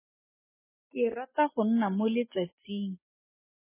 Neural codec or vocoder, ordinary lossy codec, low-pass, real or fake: none; MP3, 16 kbps; 3.6 kHz; real